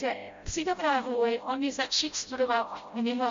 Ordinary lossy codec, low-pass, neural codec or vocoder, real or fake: MP3, 48 kbps; 7.2 kHz; codec, 16 kHz, 0.5 kbps, FreqCodec, smaller model; fake